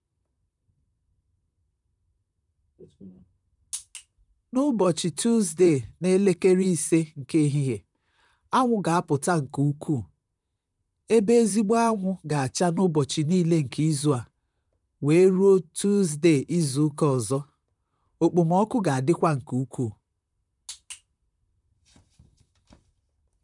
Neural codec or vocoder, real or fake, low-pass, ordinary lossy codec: vocoder, 44.1 kHz, 128 mel bands, Pupu-Vocoder; fake; 10.8 kHz; none